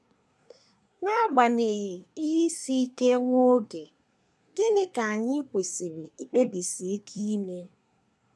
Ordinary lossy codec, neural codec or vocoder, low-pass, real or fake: none; codec, 24 kHz, 1 kbps, SNAC; none; fake